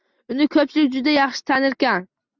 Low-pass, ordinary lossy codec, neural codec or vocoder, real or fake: 7.2 kHz; Opus, 64 kbps; none; real